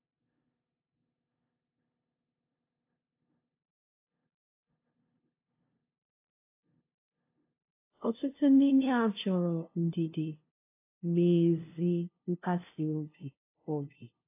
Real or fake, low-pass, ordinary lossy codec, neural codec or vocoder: fake; 3.6 kHz; AAC, 24 kbps; codec, 16 kHz, 0.5 kbps, FunCodec, trained on LibriTTS, 25 frames a second